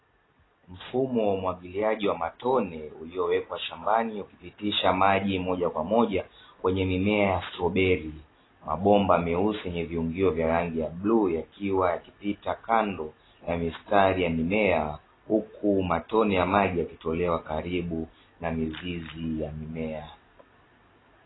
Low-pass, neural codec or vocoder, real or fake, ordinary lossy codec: 7.2 kHz; none; real; AAC, 16 kbps